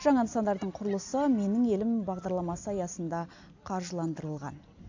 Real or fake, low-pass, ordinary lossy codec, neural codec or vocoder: real; 7.2 kHz; AAC, 48 kbps; none